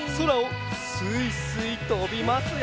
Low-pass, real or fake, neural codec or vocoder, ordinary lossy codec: none; real; none; none